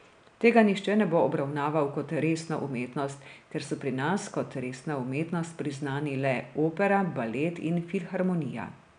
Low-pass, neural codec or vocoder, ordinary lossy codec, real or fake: 9.9 kHz; none; none; real